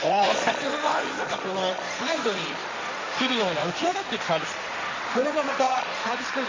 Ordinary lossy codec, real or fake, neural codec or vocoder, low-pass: MP3, 64 kbps; fake; codec, 16 kHz, 1.1 kbps, Voila-Tokenizer; 7.2 kHz